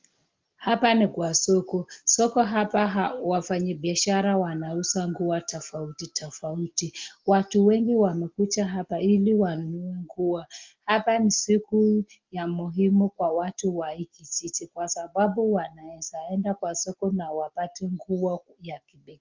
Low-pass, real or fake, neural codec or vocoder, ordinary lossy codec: 7.2 kHz; real; none; Opus, 24 kbps